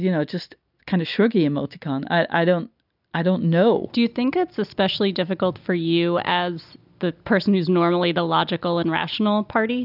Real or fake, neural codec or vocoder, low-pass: real; none; 5.4 kHz